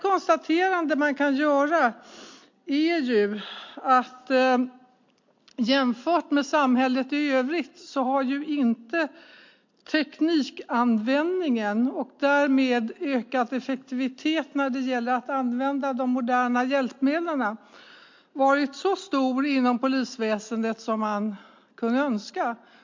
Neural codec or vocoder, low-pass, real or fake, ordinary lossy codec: none; 7.2 kHz; real; MP3, 48 kbps